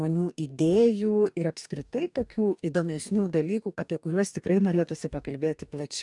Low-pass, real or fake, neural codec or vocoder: 10.8 kHz; fake; codec, 44.1 kHz, 2.6 kbps, DAC